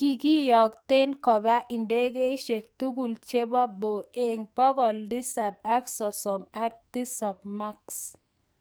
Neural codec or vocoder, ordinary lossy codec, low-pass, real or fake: codec, 44.1 kHz, 2.6 kbps, SNAC; none; none; fake